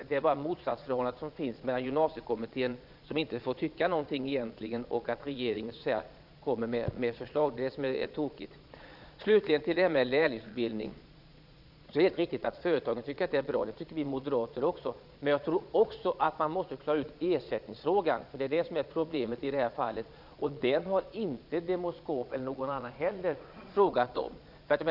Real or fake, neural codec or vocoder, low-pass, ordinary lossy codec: fake; vocoder, 22.05 kHz, 80 mel bands, Vocos; 5.4 kHz; none